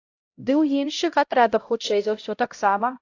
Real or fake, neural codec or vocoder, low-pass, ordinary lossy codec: fake; codec, 16 kHz, 0.5 kbps, X-Codec, HuBERT features, trained on LibriSpeech; 7.2 kHz; AAC, 48 kbps